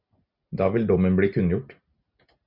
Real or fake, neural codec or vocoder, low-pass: real; none; 5.4 kHz